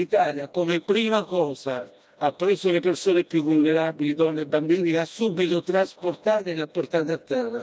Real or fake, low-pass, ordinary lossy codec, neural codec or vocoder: fake; none; none; codec, 16 kHz, 1 kbps, FreqCodec, smaller model